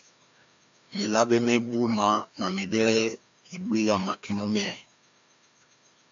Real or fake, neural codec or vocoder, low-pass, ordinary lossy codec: fake; codec, 16 kHz, 1 kbps, FreqCodec, larger model; 7.2 kHz; AAC, 64 kbps